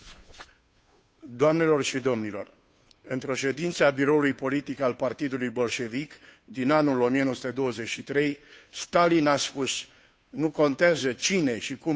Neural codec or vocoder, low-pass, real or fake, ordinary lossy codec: codec, 16 kHz, 2 kbps, FunCodec, trained on Chinese and English, 25 frames a second; none; fake; none